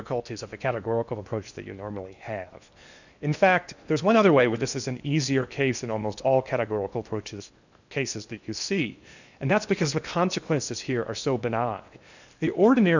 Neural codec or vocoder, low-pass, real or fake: codec, 16 kHz in and 24 kHz out, 0.8 kbps, FocalCodec, streaming, 65536 codes; 7.2 kHz; fake